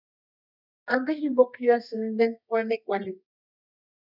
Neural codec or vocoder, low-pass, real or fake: codec, 24 kHz, 0.9 kbps, WavTokenizer, medium music audio release; 5.4 kHz; fake